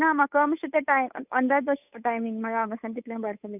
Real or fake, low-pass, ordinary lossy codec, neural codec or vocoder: fake; 3.6 kHz; none; codec, 16 kHz, 8 kbps, FunCodec, trained on Chinese and English, 25 frames a second